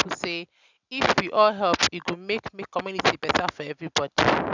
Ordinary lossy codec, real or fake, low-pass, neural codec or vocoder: none; real; 7.2 kHz; none